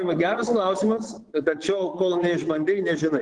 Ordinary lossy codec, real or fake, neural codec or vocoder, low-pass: Opus, 16 kbps; fake; vocoder, 22.05 kHz, 80 mel bands, Vocos; 9.9 kHz